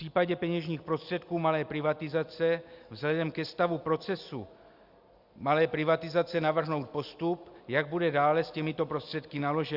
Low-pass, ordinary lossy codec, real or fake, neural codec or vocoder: 5.4 kHz; Opus, 64 kbps; real; none